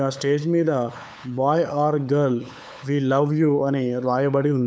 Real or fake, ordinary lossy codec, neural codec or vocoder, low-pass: fake; none; codec, 16 kHz, 8 kbps, FunCodec, trained on LibriTTS, 25 frames a second; none